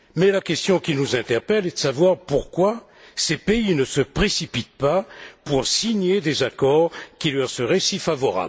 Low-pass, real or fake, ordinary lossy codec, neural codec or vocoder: none; real; none; none